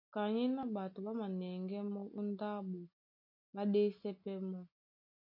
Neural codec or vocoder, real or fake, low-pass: none; real; 5.4 kHz